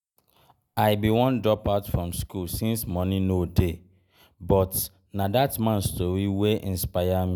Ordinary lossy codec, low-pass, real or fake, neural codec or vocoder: none; none; real; none